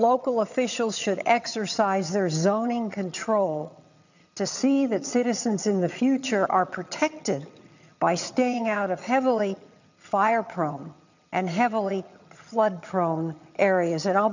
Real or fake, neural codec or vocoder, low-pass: fake; vocoder, 22.05 kHz, 80 mel bands, HiFi-GAN; 7.2 kHz